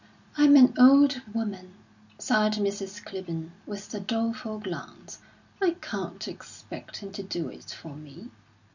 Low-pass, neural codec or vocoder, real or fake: 7.2 kHz; none; real